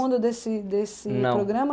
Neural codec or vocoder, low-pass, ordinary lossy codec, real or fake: none; none; none; real